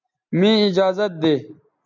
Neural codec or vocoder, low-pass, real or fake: none; 7.2 kHz; real